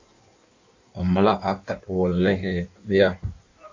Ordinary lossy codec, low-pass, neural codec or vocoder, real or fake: AAC, 48 kbps; 7.2 kHz; codec, 16 kHz in and 24 kHz out, 1.1 kbps, FireRedTTS-2 codec; fake